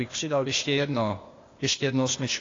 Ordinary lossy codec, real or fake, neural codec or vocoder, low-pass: AAC, 32 kbps; fake; codec, 16 kHz, 0.8 kbps, ZipCodec; 7.2 kHz